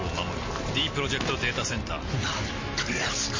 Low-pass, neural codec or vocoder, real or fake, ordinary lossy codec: 7.2 kHz; none; real; MP3, 32 kbps